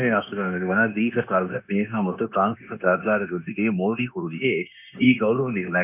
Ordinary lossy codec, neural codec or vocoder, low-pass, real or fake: none; codec, 16 kHz, 0.9 kbps, LongCat-Audio-Codec; 3.6 kHz; fake